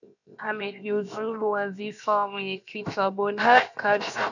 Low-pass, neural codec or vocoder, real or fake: 7.2 kHz; codec, 16 kHz, 0.7 kbps, FocalCodec; fake